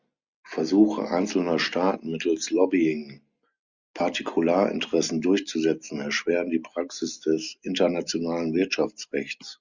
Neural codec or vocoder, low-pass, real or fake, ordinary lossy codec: none; 7.2 kHz; real; Opus, 64 kbps